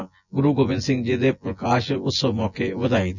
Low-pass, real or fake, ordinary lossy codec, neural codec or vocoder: 7.2 kHz; fake; none; vocoder, 24 kHz, 100 mel bands, Vocos